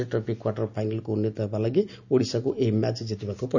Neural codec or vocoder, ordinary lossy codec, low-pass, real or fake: none; none; 7.2 kHz; real